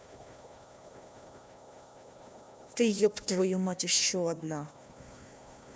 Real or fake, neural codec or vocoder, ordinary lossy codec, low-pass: fake; codec, 16 kHz, 1 kbps, FunCodec, trained on Chinese and English, 50 frames a second; none; none